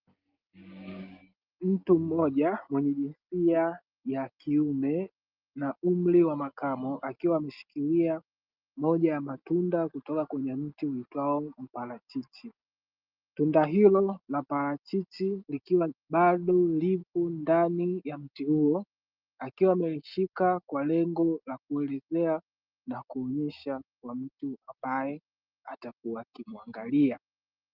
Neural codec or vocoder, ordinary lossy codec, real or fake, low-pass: none; Opus, 32 kbps; real; 5.4 kHz